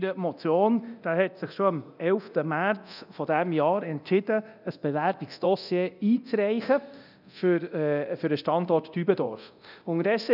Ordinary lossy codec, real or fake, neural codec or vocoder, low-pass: none; fake; codec, 24 kHz, 0.9 kbps, DualCodec; 5.4 kHz